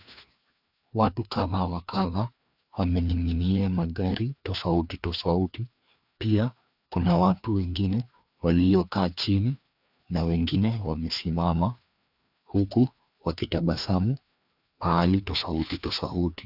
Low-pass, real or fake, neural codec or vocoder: 5.4 kHz; fake; codec, 16 kHz, 2 kbps, FreqCodec, larger model